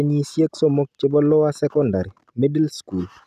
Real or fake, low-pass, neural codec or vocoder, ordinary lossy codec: real; 14.4 kHz; none; none